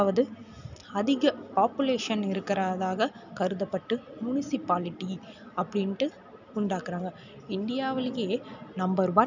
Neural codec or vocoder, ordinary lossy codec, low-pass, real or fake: none; none; 7.2 kHz; real